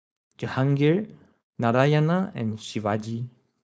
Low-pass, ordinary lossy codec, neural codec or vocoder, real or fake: none; none; codec, 16 kHz, 4.8 kbps, FACodec; fake